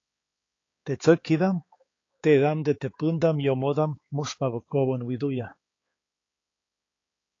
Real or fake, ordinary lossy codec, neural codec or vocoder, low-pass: fake; AAC, 32 kbps; codec, 16 kHz, 4 kbps, X-Codec, HuBERT features, trained on balanced general audio; 7.2 kHz